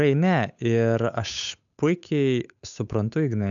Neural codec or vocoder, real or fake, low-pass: codec, 16 kHz, 8 kbps, FunCodec, trained on Chinese and English, 25 frames a second; fake; 7.2 kHz